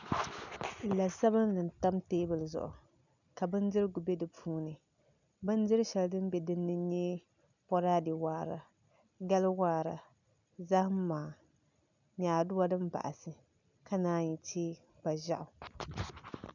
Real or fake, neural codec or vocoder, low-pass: real; none; 7.2 kHz